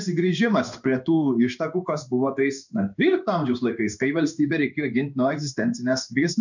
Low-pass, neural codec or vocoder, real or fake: 7.2 kHz; codec, 16 kHz in and 24 kHz out, 1 kbps, XY-Tokenizer; fake